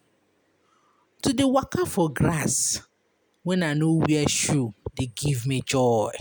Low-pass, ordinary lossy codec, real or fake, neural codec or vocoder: none; none; real; none